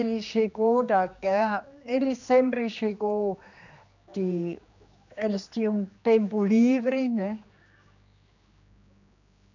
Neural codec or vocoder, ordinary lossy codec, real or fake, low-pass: codec, 16 kHz, 2 kbps, X-Codec, HuBERT features, trained on general audio; none; fake; 7.2 kHz